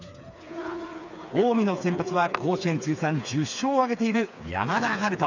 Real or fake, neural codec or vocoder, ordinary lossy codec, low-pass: fake; codec, 16 kHz, 4 kbps, FreqCodec, smaller model; none; 7.2 kHz